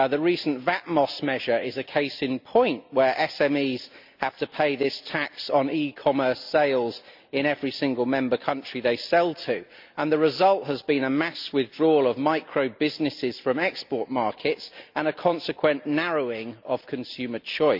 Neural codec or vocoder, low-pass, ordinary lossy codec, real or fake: none; 5.4 kHz; MP3, 48 kbps; real